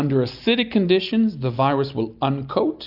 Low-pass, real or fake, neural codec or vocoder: 5.4 kHz; real; none